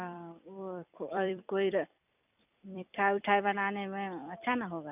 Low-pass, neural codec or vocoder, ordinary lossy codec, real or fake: 3.6 kHz; vocoder, 44.1 kHz, 128 mel bands every 256 samples, BigVGAN v2; none; fake